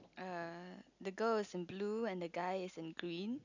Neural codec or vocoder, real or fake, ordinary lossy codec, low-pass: none; real; none; 7.2 kHz